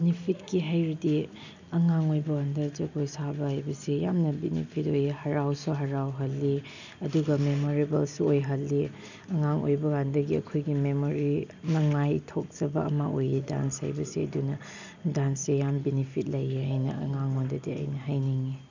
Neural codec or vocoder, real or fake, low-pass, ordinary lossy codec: none; real; 7.2 kHz; none